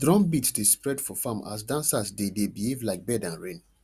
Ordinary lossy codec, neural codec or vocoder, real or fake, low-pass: Opus, 64 kbps; none; real; 14.4 kHz